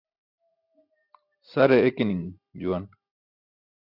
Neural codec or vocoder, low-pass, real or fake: none; 5.4 kHz; real